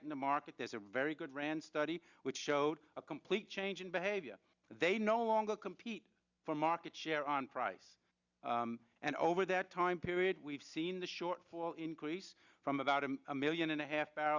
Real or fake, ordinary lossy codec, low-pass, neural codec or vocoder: real; Opus, 64 kbps; 7.2 kHz; none